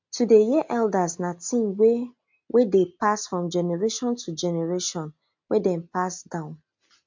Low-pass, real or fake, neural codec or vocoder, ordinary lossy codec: 7.2 kHz; real; none; MP3, 48 kbps